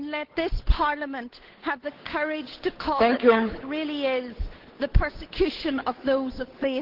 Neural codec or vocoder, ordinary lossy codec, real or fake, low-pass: codec, 16 kHz, 8 kbps, FunCodec, trained on Chinese and English, 25 frames a second; Opus, 16 kbps; fake; 5.4 kHz